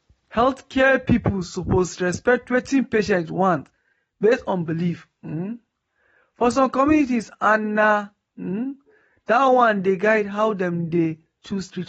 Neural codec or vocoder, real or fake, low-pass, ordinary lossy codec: none; real; 19.8 kHz; AAC, 24 kbps